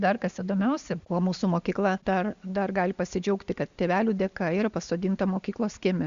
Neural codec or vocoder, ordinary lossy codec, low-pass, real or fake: none; Opus, 64 kbps; 7.2 kHz; real